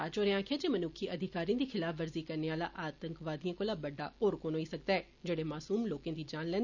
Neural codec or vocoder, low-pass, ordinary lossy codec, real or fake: none; 7.2 kHz; none; real